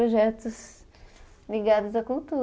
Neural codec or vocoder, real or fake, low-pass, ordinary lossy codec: none; real; none; none